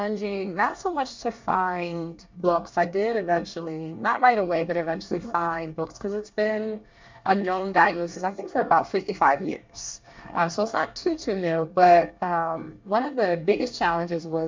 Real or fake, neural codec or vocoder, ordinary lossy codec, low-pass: fake; codec, 24 kHz, 1 kbps, SNAC; MP3, 64 kbps; 7.2 kHz